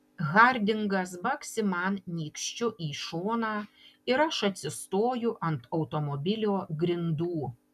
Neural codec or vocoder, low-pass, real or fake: none; 14.4 kHz; real